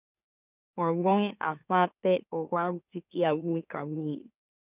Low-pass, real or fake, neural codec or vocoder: 3.6 kHz; fake; autoencoder, 44.1 kHz, a latent of 192 numbers a frame, MeloTTS